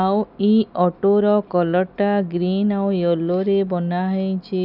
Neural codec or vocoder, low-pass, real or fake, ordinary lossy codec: none; 5.4 kHz; real; none